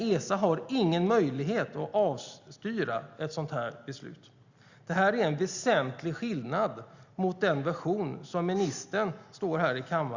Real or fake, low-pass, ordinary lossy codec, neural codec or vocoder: real; 7.2 kHz; Opus, 64 kbps; none